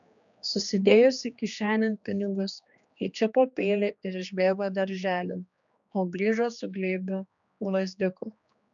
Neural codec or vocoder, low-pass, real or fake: codec, 16 kHz, 2 kbps, X-Codec, HuBERT features, trained on general audio; 7.2 kHz; fake